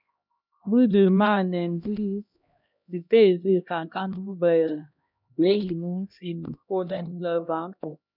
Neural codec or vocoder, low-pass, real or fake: codec, 16 kHz, 1 kbps, X-Codec, HuBERT features, trained on LibriSpeech; 5.4 kHz; fake